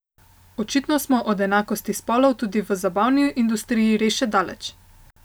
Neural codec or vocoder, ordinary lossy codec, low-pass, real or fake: none; none; none; real